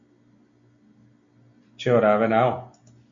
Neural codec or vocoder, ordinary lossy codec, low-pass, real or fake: none; MP3, 64 kbps; 7.2 kHz; real